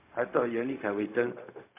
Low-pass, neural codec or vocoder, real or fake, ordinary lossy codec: 3.6 kHz; codec, 16 kHz, 0.4 kbps, LongCat-Audio-Codec; fake; MP3, 32 kbps